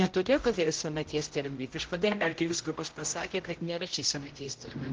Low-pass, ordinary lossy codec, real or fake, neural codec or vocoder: 7.2 kHz; Opus, 16 kbps; fake; codec, 16 kHz, 0.5 kbps, X-Codec, HuBERT features, trained on general audio